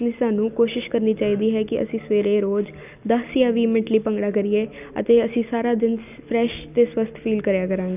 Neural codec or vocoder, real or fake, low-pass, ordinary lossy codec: none; real; 3.6 kHz; none